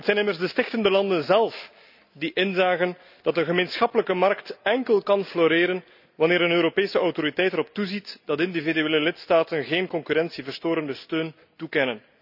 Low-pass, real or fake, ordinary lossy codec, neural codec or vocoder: 5.4 kHz; real; none; none